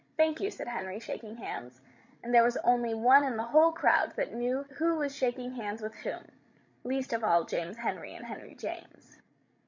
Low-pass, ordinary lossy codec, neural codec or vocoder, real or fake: 7.2 kHz; MP3, 64 kbps; codec, 16 kHz, 16 kbps, FreqCodec, larger model; fake